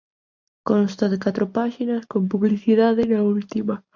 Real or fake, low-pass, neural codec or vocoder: real; 7.2 kHz; none